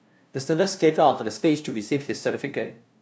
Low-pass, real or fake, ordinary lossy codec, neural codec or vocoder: none; fake; none; codec, 16 kHz, 0.5 kbps, FunCodec, trained on LibriTTS, 25 frames a second